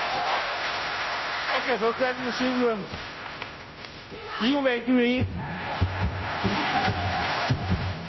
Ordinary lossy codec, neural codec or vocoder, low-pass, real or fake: MP3, 24 kbps; codec, 16 kHz, 0.5 kbps, FunCodec, trained on Chinese and English, 25 frames a second; 7.2 kHz; fake